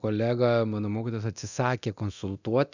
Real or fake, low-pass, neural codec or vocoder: fake; 7.2 kHz; codec, 24 kHz, 0.9 kbps, DualCodec